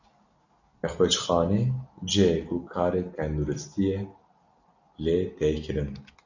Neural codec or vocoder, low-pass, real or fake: none; 7.2 kHz; real